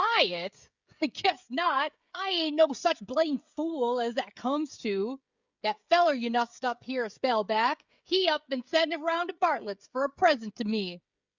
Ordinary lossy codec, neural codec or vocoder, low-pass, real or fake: Opus, 64 kbps; codec, 16 kHz, 16 kbps, FreqCodec, smaller model; 7.2 kHz; fake